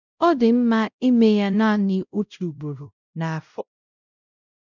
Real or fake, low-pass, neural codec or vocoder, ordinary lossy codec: fake; 7.2 kHz; codec, 16 kHz, 0.5 kbps, X-Codec, WavLM features, trained on Multilingual LibriSpeech; none